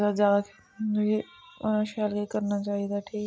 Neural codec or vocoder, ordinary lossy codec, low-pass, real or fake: none; none; none; real